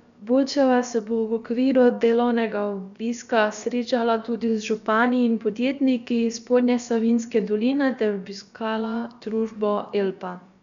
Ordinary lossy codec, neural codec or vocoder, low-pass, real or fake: none; codec, 16 kHz, about 1 kbps, DyCAST, with the encoder's durations; 7.2 kHz; fake